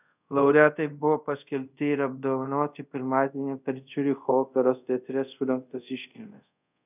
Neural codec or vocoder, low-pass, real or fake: codec, 24 kHz, 0.5 kbps, DualCodec; 3.6 kHz; fake